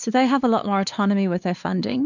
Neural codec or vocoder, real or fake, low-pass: codec, 16 kHz, 4 kbps, X-Codec, WavLM features, trained on Multilingual LibriSpeech; fake; 7.2 kHz